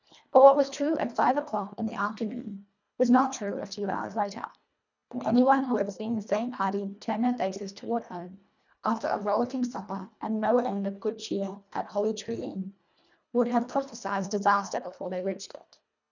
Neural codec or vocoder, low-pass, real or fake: codec, 24 kHz, 1.5 kbps, HILCodec; 7.2 kHz; fake